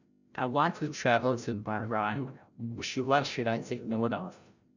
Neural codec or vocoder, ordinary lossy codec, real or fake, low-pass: codec, 16 kHz, 0.5 kbps, FreqCodec, larger model; none; fake; 7.2 kHz